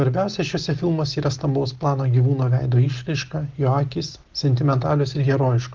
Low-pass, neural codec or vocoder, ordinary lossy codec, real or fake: 7.2 kHz; none; Opus, 16 kbps; real